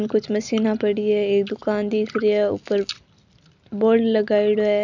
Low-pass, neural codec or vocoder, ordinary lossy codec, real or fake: 7.2 kHz; none; none; real